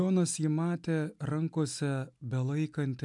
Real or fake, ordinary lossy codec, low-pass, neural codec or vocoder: real; MP3, 96 kbps; 10.8 kHz; none